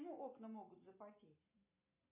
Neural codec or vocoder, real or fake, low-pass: none; real; 3.6 kHz